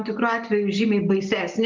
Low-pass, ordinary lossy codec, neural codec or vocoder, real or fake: 7.2 kHz; Opus, 32 kbps; none; real